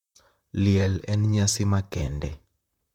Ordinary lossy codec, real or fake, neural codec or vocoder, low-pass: MP3, 96 kbps; fake; vocoder, 44.1 kHz, 128 mel bands, Pupu-Vocoder; 19.8 kHz